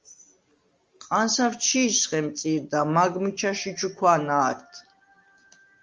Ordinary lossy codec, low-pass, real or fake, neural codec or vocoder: Opus, 32 kbps; 7.2 kHz; real; none